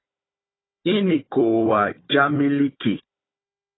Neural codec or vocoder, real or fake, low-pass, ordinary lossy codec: codec, 16 kHz, 4 kbps, FunCodec, trained on Chinese and English, 50 frames a second; fake; 7.2 kHz; AAC, 16 kbps